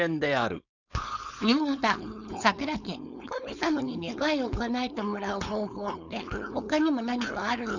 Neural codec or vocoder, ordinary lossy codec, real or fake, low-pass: codec, 16 kHz, 4.8 kbps, FACodec; none; fake; 7.2 kHz